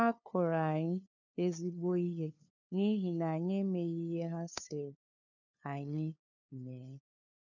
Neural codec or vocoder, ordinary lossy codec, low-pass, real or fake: codec, 16 kHz, 2 kbps, FunCodec, trained on LibriTTS, 25 frames a second; none; 7.2 kHz; fake